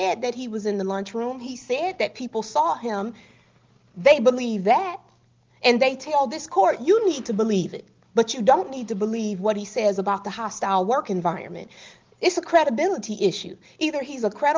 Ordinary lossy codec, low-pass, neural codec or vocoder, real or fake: Opus, 32 kbps; 7.2 kHz; none; real